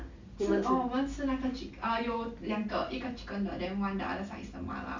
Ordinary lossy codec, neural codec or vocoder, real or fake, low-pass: Opus, 64 kbps; none; real; 7.2 kHz